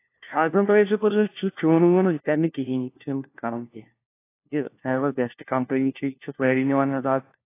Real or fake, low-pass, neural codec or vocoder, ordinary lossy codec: fake; 3.6 kHz; codec, 16 kHz, 1 kbps, FunCodec, trained on LibriTTS, 50 frames a second; AAC, 24 kbps